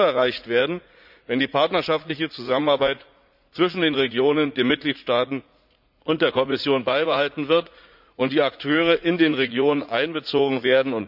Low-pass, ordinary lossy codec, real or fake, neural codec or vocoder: 5.4 kHz; none; fake; vocoder, 44.1 kHz, 80 mel bands, Vocos